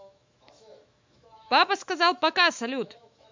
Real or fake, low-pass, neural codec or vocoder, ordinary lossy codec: fake; 7.2 kHz; autoencoder, 48 kHz, 128 numbers a frame, DAC-VAE, trained on Japanese speech; MP3, 64 kbps